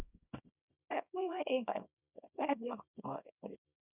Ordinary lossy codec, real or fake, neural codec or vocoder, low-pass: none; fake; codec, 24 kHz, 0.9 kbps, WavTokenizer, small release; 3.6 kHz